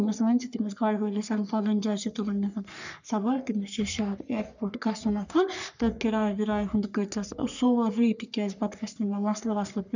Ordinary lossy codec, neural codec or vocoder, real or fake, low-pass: none; codec, 44.1 kHz, 3.4 kbps, Pupu-Codec; fake; 7.2 kHz